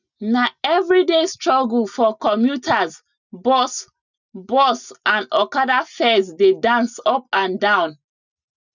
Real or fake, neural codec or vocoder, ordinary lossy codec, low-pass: real; none; none; 7.2 kHz